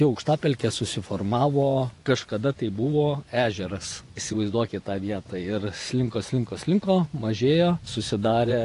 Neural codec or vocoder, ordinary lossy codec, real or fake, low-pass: vocoder, 24 kHz, 100 mel bands, Vocos; AAC, 48 kbps; fake; 10.8 kHz